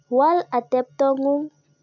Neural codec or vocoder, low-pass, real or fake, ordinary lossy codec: none; 7.2 kHz; real; none